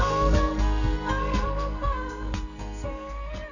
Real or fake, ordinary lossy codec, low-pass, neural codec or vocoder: fake; none; 7.2 kHz; codec, 44.1 kHz, 2.6 kbps, SNAC